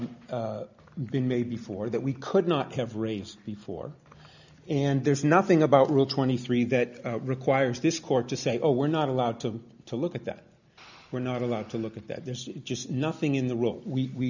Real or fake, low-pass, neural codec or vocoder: real; 7.2 kHz; none